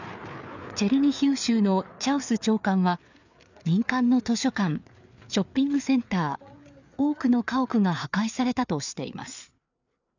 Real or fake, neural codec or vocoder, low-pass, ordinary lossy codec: fake; codec, 16 kHz, 4 kbps, FreqCodec, larger model; 7.2 kHz; none